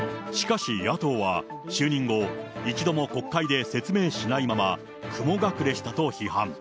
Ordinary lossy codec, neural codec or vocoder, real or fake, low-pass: none; none; real; none